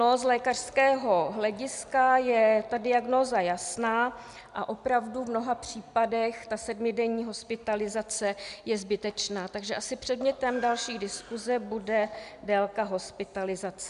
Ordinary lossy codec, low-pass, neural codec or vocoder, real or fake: MP3, 96 kbps; 10.8 kHz; none; real